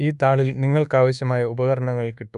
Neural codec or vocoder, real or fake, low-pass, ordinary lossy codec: codec, 24 kHz, 1.2 kbps, DualCodec; fake; 10.8 kHz; none